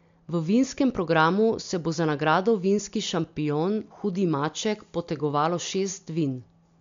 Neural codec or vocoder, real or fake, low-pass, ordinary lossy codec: none; real; 7.2 kHz; MP3, 64 kbps